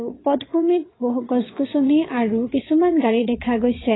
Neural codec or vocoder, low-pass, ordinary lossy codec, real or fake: vocoder, 22.05 kHz, 80 mel bands, WaveNeXt; 7.2 kHz; AAC, 16 kbps; fake